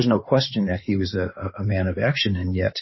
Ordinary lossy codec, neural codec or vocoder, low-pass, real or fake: MP3, 24 kbps; codec, 16 kHz in and 24 kHz out, 2.2 kbps, FireRedTTS-2 codec; 7.2 kHz; fake